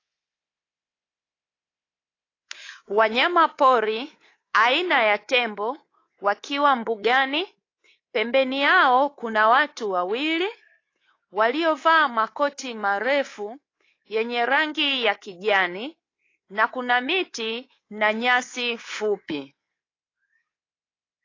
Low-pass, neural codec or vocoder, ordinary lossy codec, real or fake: 7.2 kHz; codec, 24 kHz, 3.1 kbps, DualCodec; AAC, 32 kbps; fake